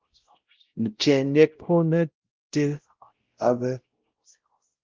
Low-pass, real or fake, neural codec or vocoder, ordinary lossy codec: 7.2 kHz; fake; codec, 16 kHz, 0.5 kbps, X-Codec, WavLM features, trained on Multilingual LibriSpeech; Opus, 16 kbps